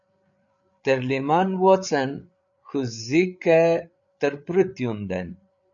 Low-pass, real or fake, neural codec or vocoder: 7.2 kHz; fake; codec, 16 kHz, 8 kbps, FreqCodec, larger model